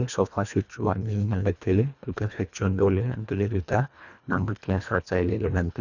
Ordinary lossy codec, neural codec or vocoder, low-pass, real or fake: none; codec, 24 kHz, 1.5 kbps, HILCodec; 7.2 kHz; fake